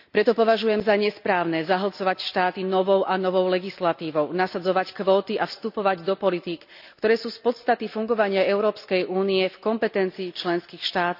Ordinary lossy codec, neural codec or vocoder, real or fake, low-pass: none; none; real; 5.4 kHz